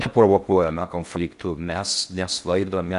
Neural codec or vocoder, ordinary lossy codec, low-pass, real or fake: codec, 16 kHz in and 24 kHz out, 0.6 kbps, FocalCodec, streaming, 4096 codes; AAC, 64 kbps; 10.8 kHz; fake